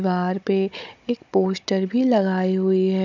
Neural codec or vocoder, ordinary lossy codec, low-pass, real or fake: codec, 16 kHz, 16 kbps, FunCodec, trained on Chinese and English, 50 frames a second; none; 7.2 kHz; fake